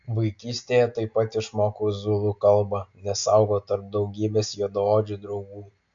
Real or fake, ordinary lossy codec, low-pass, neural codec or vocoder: real; AAC, 64 kbps; 7.2 kHz; none